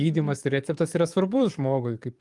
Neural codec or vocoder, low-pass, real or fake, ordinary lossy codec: codec, 44.1 kHz, 7.8 kbps, DAC; 10.8 kHz; fake; Opus, 32 kbps